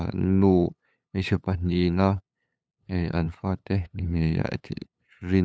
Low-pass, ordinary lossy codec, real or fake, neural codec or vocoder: none; none; fake; codec, 16 kHz, 8 kbps, FunCodec, trained on LibriTTS, 25 frames a second